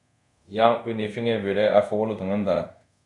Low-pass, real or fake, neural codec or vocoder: 10.8 kHz; fake; codec, 24 kHz, 0.5 kbps, DualCodec